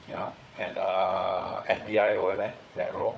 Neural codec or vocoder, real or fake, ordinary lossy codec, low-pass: codec, 16 kHz, 4 kbps, FunCodec, trained on Chinese and English, 50 frames a second; fake; none; none